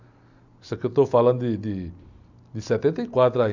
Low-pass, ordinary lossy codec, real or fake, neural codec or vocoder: 7.2 kHz; none; real; none